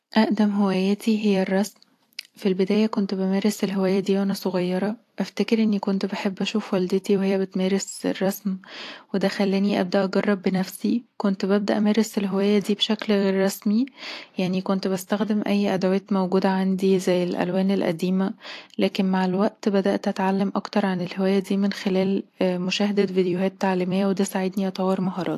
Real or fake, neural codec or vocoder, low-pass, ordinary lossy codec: fake; vocoder, 44.1 kHz, 128 mel bands every 256 samples, BigVGAN v2; 14.4 kHz; AAC, 64 kbps